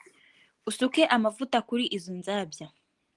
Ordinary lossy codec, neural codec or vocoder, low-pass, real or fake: Opus, 24 kbps; none; 10.8 kHz; real